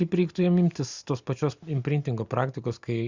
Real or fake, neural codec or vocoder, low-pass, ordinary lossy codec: real; none; 7.2 kHz; Opus, 64 kbps